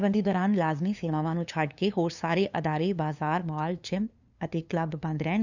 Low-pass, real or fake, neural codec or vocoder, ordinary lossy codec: 7.2 kHz; fake; codec, 16 kHz, 2 kbps, FunCodec, trained on LibriTTS, 25 frames a second; none